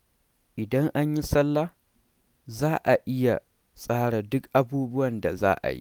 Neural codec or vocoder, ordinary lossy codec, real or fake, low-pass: none; none; real; none